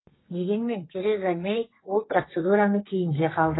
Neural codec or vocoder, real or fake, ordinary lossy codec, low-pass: codec, 32 kHz, 1.9 kbps, SNAC; fake; AAC, 16 kbps; 7.2 kHz